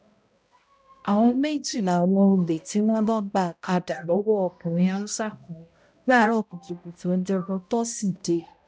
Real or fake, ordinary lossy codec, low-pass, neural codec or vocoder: fake; none; none; codec, 16 kHz, 0.5 kbps, X-Codec, HuBERT features, trained on balanced general audio